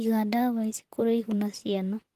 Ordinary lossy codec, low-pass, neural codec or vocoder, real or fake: Opus, 24 kbps; 14.4 kHz; vocoder, 44.1 kHz, 128 mel bands, Pupu-Vocoder; fake